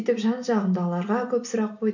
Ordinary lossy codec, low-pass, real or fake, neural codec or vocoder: none; 7.2 kHz; real; none